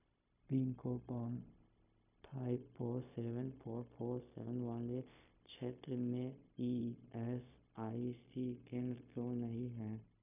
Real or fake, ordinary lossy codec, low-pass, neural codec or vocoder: fake; none; 3.6 kHz; codec, 16 kHz, 0.4 kbps, LongCat-Audio-Codec